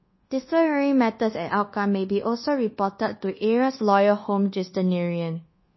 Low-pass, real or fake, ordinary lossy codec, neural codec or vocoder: 7.2 kHz; fake; MP3, 24 kbps; codec, 24 kHz, 1.2 kbps, DualCodec